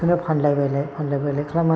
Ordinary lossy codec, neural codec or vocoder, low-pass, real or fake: none; none; none; real